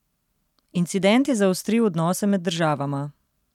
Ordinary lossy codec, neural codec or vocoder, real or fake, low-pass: none; none; real; 19.8 kHz